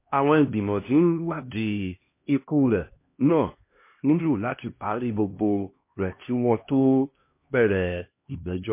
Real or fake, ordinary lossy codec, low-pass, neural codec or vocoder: fake; MP3, 24 kbps; 3.6 kHz; codec, 16 kHz, 1 kbps, X-Codec, HuBERT features, trained on LibriSpeech